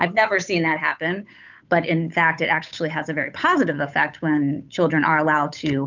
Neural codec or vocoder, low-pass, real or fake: codec, 16 kHz, 8 kbps, FunCodec, trained on Chinese and English, 25 frames a second; 7.2 kHz; fake